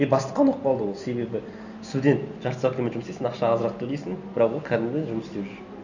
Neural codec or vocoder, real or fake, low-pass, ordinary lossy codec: none; real; 7.2 kHz; none